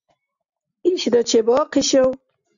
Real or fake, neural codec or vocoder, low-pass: real; none; 7.2 kHz